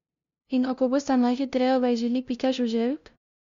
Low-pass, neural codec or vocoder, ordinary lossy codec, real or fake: 7.2 kHz; codec, 16 kHz, 0.5 kbps, FunCodec, trained on LibriTTS, 25 frames a second; Opus, 64 kbps; fake